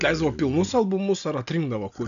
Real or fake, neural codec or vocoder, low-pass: real; none; 7.2 kHz